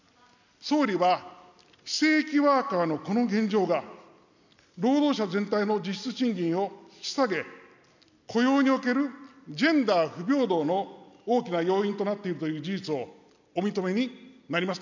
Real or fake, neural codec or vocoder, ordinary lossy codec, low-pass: real; none; none; 7.2 kHz